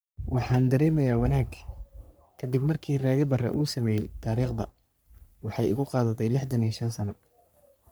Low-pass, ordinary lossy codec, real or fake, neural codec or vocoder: none; none; fake; codec, 44.1 kHz, 3.4 kbps, Pupu-Codec